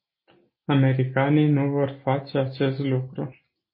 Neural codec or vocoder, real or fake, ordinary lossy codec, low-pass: none; real; MP3, 24 kbps; 5.4 kHz